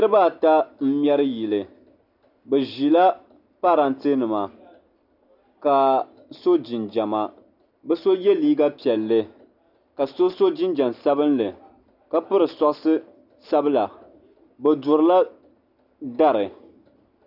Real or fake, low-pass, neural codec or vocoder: real; 5.4 kHz; none